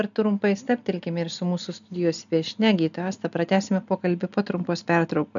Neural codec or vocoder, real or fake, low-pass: none; real; 7.2 kHz